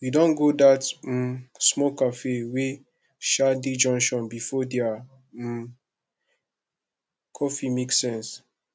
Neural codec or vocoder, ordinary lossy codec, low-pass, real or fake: none; none; none; real